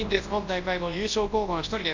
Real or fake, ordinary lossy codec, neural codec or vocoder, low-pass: fake; AAC, 48 kbps; codec, 24 kHz, 0.9 kbps, WavTokenizer, large speech release; 7.2 kHz